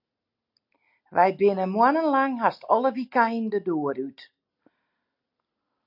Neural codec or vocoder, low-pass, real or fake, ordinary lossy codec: none; 5.4 kHz; real; AAC, 48 kbps